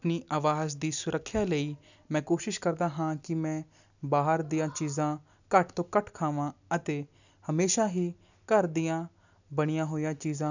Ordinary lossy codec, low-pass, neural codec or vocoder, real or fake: none; 7.2 kHz; none; real